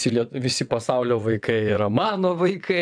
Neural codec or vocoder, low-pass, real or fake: vocoder, 22.05 kHz, 80 mel bands, WaveNeXt; 9.9 kHz; fake